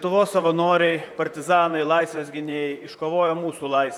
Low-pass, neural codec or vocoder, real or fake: 19.8 kHz; vocoder, 44.1 kHz, 128 mel bands, Pupu-Vocoder; fake